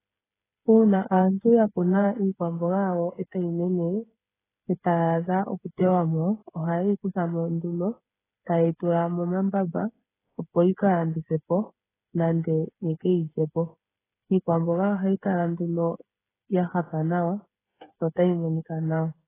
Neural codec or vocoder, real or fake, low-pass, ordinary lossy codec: codec, 16 kHz, 8 kbps, FreqCodec, smaller model; fake; 3.6 kHz; AAC, 16 kbps